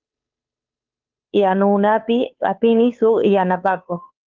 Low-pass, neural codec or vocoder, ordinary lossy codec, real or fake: 7.2 kHz; codec, 16 kHz, 2 kbps, FunCodec, trained on Chinese and English, 25 frames a second; Opus, 24 kbps; fake